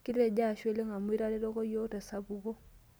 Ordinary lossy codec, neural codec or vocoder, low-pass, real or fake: none; none; none; real